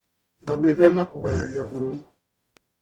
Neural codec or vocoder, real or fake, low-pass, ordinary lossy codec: codec, 44.1 kHz, 0.9 kbps, DAC; fake; 19.8 kHz; Opus, 64 kbps